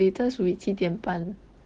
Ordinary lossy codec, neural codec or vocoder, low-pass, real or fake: Opus, 16 kbps; none; 9.9 kHz; real